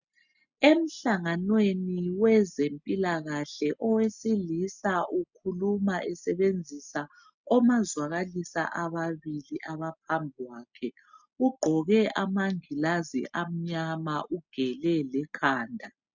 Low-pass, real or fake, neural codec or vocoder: 7.2 kHz; real; none